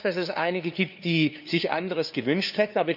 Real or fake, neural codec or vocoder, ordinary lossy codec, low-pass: fake; codec, 16 kHz, 2 kbps, FunCodec, trained on LibriTTS, 25 frames a second; none; 5.4 kHz